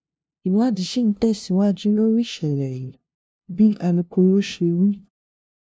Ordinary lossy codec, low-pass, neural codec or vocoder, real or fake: none; none; codec, 16 kHz, 0.5 kbps, FunCodec, trained on LibriTTS, 25 frames a second; fake